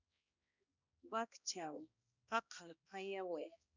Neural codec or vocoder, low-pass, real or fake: codec, 16 kHz, 1 kbps, X-Codec, HuBERT features, trained on balanced general audio; 7.2 kHz; fake